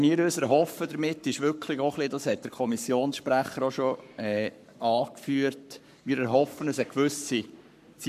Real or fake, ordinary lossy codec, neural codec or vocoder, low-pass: fake; none; codec, 44.1 kHz, 7.8 kbps, Pupu-Codec; 14.4 kHz